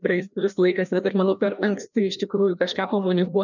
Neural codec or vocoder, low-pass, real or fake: codec, 16 kHz, 1 kbps, FreqCodec, larger model; 7.2 kHz; fake